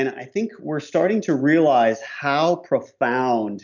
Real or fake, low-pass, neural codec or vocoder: real; 7.2 kHz; none